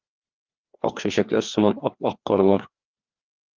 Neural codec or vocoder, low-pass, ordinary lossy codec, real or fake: codec, 16 kHz, 2 kbps, FreqCodec, larger model; 7.2 kHz; Opus, 32 kbps; fake